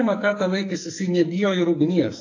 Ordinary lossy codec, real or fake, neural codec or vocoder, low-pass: AAC, 48 kbps; fake; codec, 44.1 kHz, 2.6 kbps, SNAC; 7.2 kHz